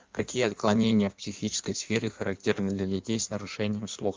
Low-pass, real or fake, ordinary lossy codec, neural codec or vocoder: 7.2 kHz; fake; Opus, 32 kbps; codec, 16 kHz in and 24 kHz out, 1.1 kbps, FireRedTTS-2 codec